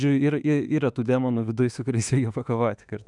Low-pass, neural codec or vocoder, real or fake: 10.8 kHz; autoencoder, 48 kHz, 32 numbers a frame, DAC-VAE, trained on Japanese speech; fake